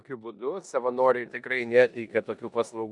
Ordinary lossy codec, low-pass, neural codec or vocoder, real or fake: MP3, 96 kbps; 10.8 kHz; codec, 16 kHz in and 24 kHz out, 0.9 kbps, LongCat-Audio-Codec, four codebook decoder; fake